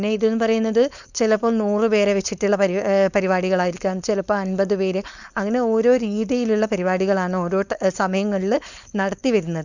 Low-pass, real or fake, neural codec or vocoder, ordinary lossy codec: 7.2 kHz; fake; codec, 16 kHz, 4.8 kbps, FACodec; none